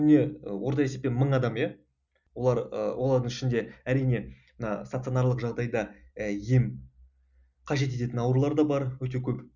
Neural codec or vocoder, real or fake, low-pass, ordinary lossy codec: none; real; 7.2 kHz; none